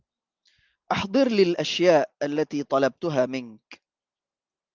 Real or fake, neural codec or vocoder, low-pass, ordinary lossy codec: real; none; 7.2 kHz; Opus, 24 kbps